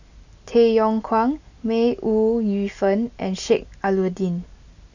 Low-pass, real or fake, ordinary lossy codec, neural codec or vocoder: 7.2 kHz; real; none; none